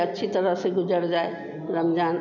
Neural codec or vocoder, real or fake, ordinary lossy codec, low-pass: none; real; none; 7.2 kHz